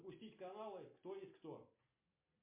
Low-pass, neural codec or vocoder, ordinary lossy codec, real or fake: 3.6 kHz; none; MP3, 24 kbps; real